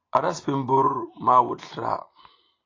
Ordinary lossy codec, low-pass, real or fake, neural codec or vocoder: AAC, 32 kbps; 7.2 kHz; real; none